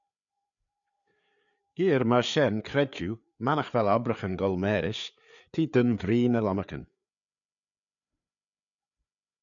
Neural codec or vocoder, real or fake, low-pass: codec, 16 kHz, 4 kbps, FreqCodec, larger model; fake; 7.2 kHz